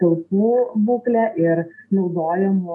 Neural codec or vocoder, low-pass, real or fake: none; 10.8 kHz; real